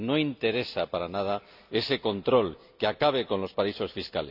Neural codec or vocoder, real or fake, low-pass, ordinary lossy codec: none; real; 5.4 kHz; none